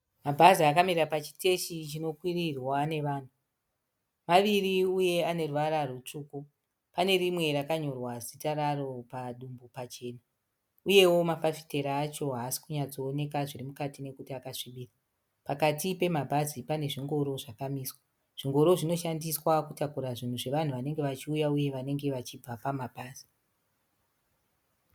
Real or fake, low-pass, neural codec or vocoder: real; 19.8 kHz; none